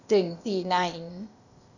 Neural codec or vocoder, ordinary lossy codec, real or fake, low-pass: codec, 16 kHz, 0.8 kbps, ZipCodec; none; fake; 7.2 kHz